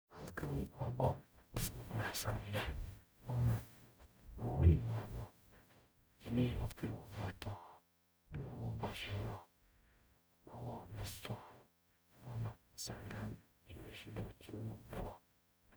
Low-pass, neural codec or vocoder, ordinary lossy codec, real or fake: none; codec, 44.1 kHz, 0.9 kbps, DAC; none; fake